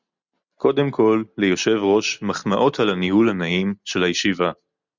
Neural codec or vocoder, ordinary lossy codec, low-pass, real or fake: none; Opus, 64 kbps; 7.2 kHz; real